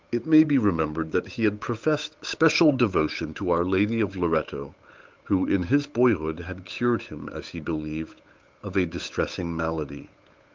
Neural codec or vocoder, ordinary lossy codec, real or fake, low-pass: codec, 16 kHz, 8 kbps, FunCodec, trained on Chinese and English, 25 frames a second; Opus, 24 kbps; fake; 7.2 kHz